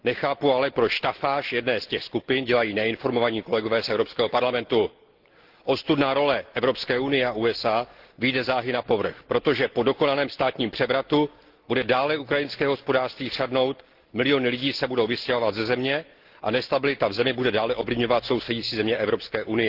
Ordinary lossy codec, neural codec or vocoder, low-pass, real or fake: Opus, 16 kbps; none; 5.4 kHz; real